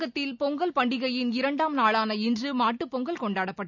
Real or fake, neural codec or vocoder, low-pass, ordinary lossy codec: real; none; 7.2 kHz; none